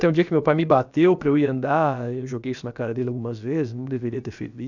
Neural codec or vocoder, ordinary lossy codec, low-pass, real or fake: codec, 16 kHz, about 1 kbps, DyCAST, with the encoder's durations; none; 7.2 kHz; fake